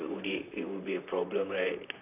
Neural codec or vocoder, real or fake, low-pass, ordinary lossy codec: vocoder, 44.1 kHz, 128 mel bands, Pupu-Vocoder; fake; 3.6 kHz; none